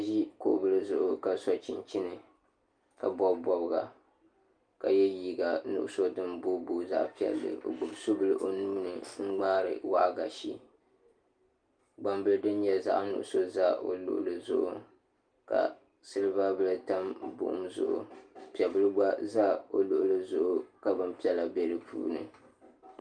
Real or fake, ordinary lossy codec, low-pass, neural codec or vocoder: real; Opus, 32 kbps; 9.9 kHz; none